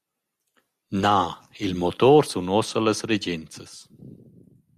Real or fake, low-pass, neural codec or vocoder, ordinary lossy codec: real; 14.4 kHz; none; Opus, 64 kbps